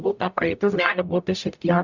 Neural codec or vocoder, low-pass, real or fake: codec, 44.1 kHz, 0.9 kbps, DAC; 7.2 kHz; fake